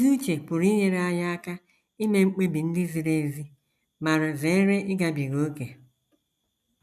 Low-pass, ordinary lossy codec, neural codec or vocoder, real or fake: 14.4 kHz; none; none; real